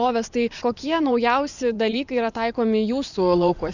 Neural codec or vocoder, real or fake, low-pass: vocoder, 24 kHz, 100 mel bands, Vocos; fake; 7.2 kHz